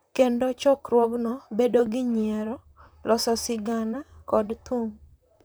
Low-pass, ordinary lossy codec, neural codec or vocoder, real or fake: none; none; vocoder, 44.1 kHz, 128 mel bands, Pupu-Vocoder; fake